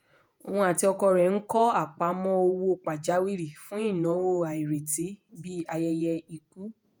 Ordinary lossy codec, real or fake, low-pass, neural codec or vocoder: none; fake; none; vocoder, 48 kHz, 128 mel bands, Vocos